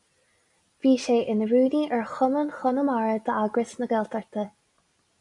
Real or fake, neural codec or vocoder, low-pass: real; none; 10.8 kHz